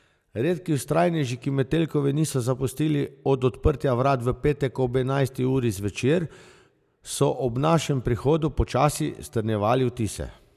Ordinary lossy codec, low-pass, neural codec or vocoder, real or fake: none; 14.4 kHz; none; real